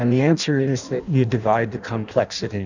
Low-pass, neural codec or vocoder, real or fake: 7.2 kHz; codec, 16 kHz in and 24 kHz out, 0.6 kbps, FireRedTTS-2 codec; fake